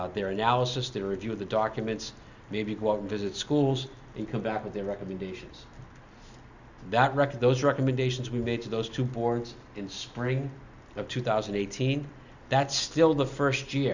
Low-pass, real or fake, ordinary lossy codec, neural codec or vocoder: 7.2 kHz; real; Opus, 64 kbps; none